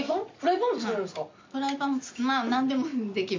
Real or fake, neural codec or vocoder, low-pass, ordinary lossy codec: real; none; 7.2 kHz; MP3, 64 kbps